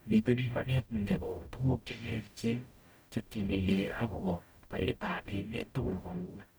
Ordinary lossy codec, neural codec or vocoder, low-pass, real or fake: none; codec, 44.1 kHz, 0.9 kbps, DAC; none; fake